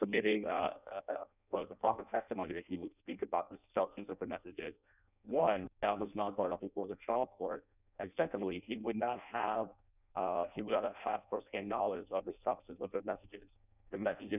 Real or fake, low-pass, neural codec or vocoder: fake; 3.6 kHz; codec, 16 kHz in and 24 kHz out, 0.6 kbps, FireRedTTS-2 codec